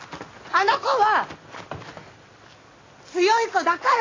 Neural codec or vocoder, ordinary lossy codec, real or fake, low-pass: none; AAC, 32 kbps; real; 7.2 kHz